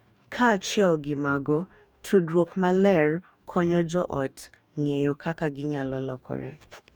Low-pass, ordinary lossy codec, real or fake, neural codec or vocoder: 19.8 kHz; none; fake; codec, 44.1 kHz, 2.6 kbps, DAC